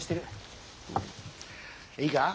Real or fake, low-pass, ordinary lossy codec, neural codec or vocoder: real; none; none; none